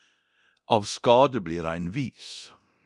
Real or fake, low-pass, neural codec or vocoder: fake; 10.8 kHz; codec, 24 kHz, 0.9 kbps, DualCodec